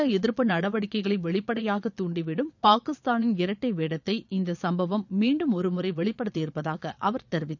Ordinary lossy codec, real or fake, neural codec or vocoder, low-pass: none; fake; vocoder, 22.05 kHz, 80 mel bands, Vocos; 7.2 kHz